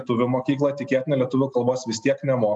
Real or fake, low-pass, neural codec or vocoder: real; 10.8 kHz; none